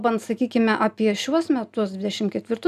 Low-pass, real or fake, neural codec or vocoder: 14.4 kHz; real; none